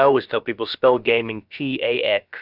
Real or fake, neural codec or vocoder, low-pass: fake; codec, 16 kHz, about 1 kbps, DyCAST, with the encoder's durations; 5.4 kHz